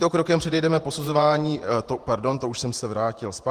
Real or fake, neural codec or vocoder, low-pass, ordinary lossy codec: fake; vocoder, 48 kHz, 128 mel bands, Vocos; 14.4 kHz; Opus, 24 kbps